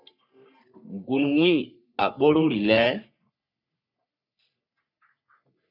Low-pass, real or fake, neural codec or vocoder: 5.4 kHz; fake; codec, 44.1 kHz, 3.4 kbps, Pupu-Codec